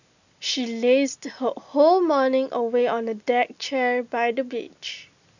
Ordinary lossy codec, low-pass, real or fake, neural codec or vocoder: none; 7.2 kHz; real; none